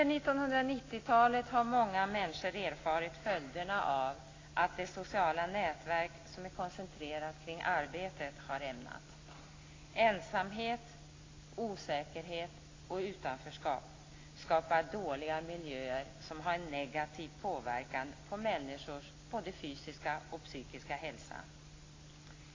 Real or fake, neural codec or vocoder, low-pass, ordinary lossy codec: real; none; 7.2 kHz; AAC, 32 kbps